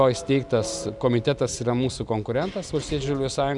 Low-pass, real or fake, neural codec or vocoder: 10.8 kHz; real; none